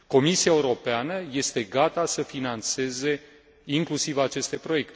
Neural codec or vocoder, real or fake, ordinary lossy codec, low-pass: none; real; none; none